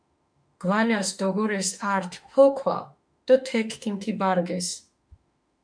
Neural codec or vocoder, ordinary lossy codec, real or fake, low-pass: autoencoder, 48 kHz, 32 numbers a frame, DAC-VAE, trained on Japanese speech; MP3, 96 kbps; fake; 9.9 kHz